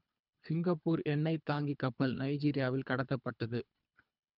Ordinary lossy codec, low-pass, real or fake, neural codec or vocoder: none; 5.4 kHz; fake; codec, 24 kHz, 3 kbps, HILCodec